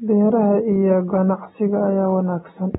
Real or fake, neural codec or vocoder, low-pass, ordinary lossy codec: real; none; 19.8 kHz; AAC, 16 kbps